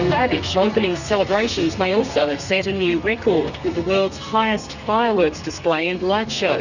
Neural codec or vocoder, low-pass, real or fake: codec, 32 kHz, 1.9 kbps, SNAC; 7.2 kHz; fake